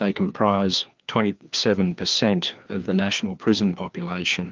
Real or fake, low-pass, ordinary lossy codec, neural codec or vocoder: fake; 7.2 kHz; Opus, 24 kbps; codec, 16 kHz, 2 kbps, FreqCodec, larger model